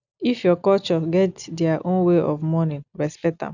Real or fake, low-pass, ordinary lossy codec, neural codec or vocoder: real; 7.2 kHz; none; none